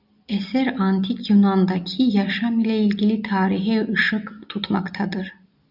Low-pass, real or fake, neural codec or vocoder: 5.4 kHz; real; none